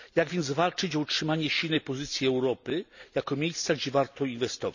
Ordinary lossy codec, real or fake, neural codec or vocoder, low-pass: none; real; none; 7.2 kHz